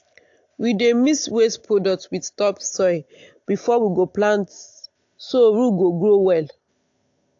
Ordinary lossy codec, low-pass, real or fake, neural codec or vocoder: AAC, 48 kbps; 7.2 kHz; real; none